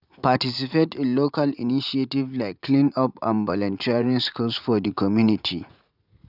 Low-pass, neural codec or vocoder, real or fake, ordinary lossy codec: 5.4 kHz; vocoder, 44.1 kHz, 80 mel bands, Vocos; fake; none